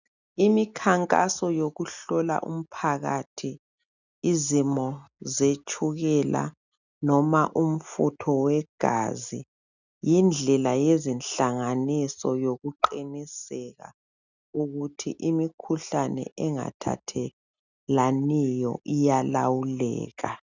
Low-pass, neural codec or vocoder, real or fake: 7.2 kHz; none; real